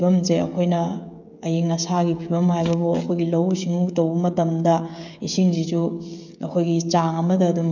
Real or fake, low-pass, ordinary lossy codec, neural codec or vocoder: fake; 7.2 kHz; none; codec, 16 kHz, 16 kbps, FreqCodec, smaller model